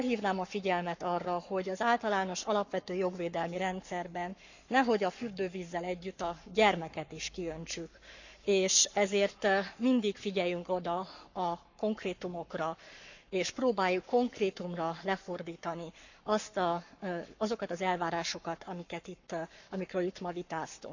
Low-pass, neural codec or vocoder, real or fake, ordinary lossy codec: 7.2 kHz; codec, 44.1 kHz, 7.8 kbps, Pupu-Codec; fake; none